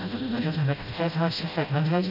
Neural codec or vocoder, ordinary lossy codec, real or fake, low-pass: codec, 16 kHz, 0.5 kbps, FreqCodec, smaller model; none; fake; 5.4 kHz